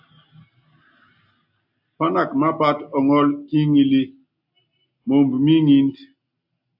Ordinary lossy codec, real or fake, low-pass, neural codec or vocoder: AAC, 48 kbps; real; 5.4 kHz; none